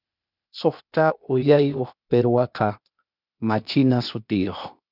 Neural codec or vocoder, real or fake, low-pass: codec, 16 kHz, 0.8 kbps, ZipCodec; fake; 5.4 kHz